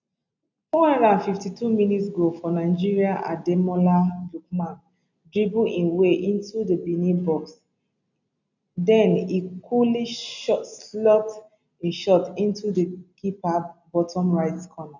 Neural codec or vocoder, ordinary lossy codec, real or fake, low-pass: none; none; real; 7.2 kHz